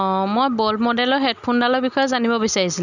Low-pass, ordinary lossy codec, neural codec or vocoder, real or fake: 7.2 kHz; none; none; real